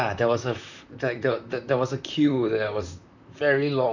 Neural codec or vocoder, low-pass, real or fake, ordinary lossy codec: vocoder, 44.1 kHz, 128 mel bands, Pupu-Vocoder; 7.2 kHz; fake; none